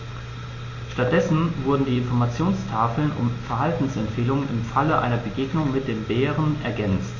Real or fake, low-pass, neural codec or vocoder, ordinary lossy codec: real; 7.2 kHz; none; MP3, 32 kbps